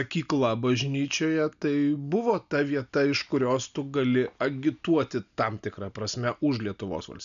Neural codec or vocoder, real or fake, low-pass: none; real; 7.2 kHz